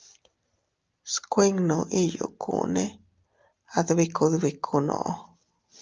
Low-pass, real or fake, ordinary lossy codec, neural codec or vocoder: 7.2 kHz; real; Opus, 24 kbps; none